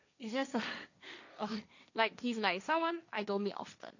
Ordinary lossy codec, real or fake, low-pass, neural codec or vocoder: none; fake; 7.2 kHz; codec, 16 kHz, 1.1 kbps, Voila-Tokenizer